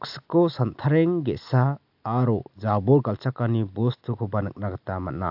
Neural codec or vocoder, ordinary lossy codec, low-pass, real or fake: none; AAC, 48 kbps; 5.4 kHz; real